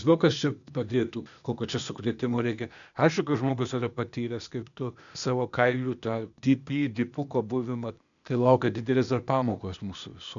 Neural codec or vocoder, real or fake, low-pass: codec, 16 kHz, 0.8 kbps, ZipCodec; fake; 7.2 kHz